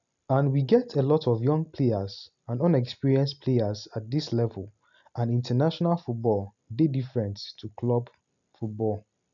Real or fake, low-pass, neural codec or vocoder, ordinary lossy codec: real; 7.2 kHz; none; MP3, 96 kbps